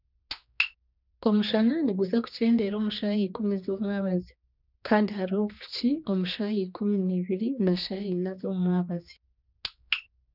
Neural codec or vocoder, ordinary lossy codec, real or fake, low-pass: codec, 16 kHz, 2 kbps, X-Codec, HuBERT features, trained on general audio; none; fake; 5.4 kHz